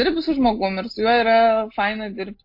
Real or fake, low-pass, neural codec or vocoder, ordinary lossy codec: real; 5.4 kHz; none; MP3, 32 kbps